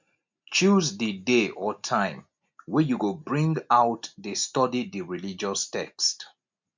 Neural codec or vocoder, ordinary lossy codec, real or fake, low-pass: none; MP3, 64 kbps; real; 7.2 kHz